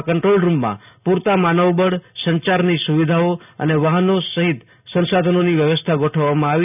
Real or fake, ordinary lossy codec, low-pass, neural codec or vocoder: real; none; 3.6 kHz; none